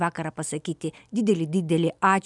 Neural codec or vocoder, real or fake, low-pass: none; real; 10.8 kHz